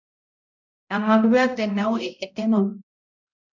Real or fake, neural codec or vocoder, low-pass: fake; codec, 16 kHz, 0.5 kbps, X-Codec, HuBERT features, trained on balanced general audio; 7.2 kHz